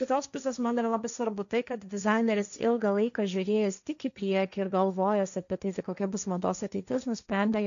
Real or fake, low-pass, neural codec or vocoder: fake; 7.2 kHz; codec, 16 kHz, 1.1 kbps, Voila-Tokenizer